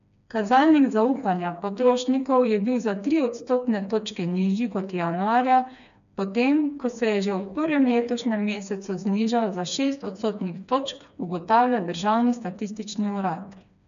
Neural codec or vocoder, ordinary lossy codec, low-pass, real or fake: codec, 16 kHz, 2 kbps, FreqCodec, smaller model; none; 7.2 kHz; fake